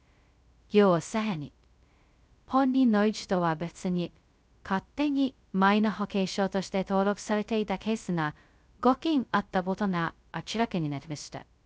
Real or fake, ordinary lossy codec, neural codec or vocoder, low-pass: fake; none; codec, 16 kHz, 0.2 kbps, FocalCodec; none